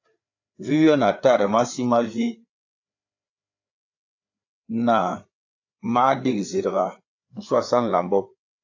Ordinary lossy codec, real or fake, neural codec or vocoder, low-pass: AAC, 48 kbps; fake; codec, 16 kHz, 4 kbps, FreqCodec, larger model; 7.2 kHz